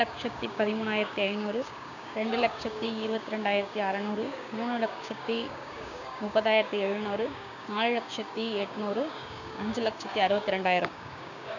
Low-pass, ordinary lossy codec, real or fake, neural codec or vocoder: 7.2 kHz; none; fake; codec, 16 kHz, 6 kbps, DAC